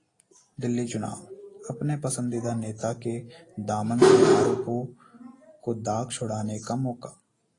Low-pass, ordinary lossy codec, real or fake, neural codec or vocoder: 10.8 kHz; AAC, 32 kbps; real; none